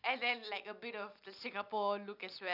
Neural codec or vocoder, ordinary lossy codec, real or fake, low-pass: none; none; real; 5.4 kHz